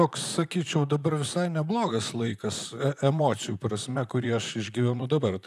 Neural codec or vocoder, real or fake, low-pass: vocoder, 44.1 kHz, 128 mel bands, Pupu-Vocoder; fake; 14.4 kHz